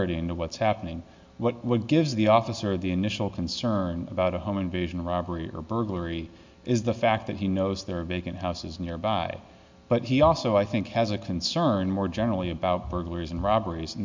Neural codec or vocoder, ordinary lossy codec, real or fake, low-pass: none; MP3, 64 kbps; real; 7.2 kHz